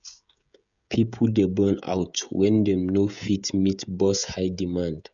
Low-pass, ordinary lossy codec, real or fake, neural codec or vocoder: 7.2 kHz; none; fake; codec, 16 kHz, 16 kbps, FreqCodec, smaller model